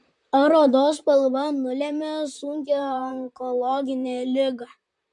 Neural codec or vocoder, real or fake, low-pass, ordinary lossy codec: vocoder, 44.1 kHz, 128 mel bands, Pupu-Vocoder; fake; 10.8 kHz; MP3, 64 kbps